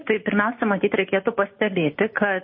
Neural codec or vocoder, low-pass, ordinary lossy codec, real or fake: none; 7.2 kHz; MP3, 24 kbps; real